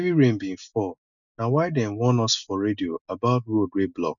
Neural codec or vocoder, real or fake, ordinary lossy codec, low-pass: none; real; none; 7.2 kHz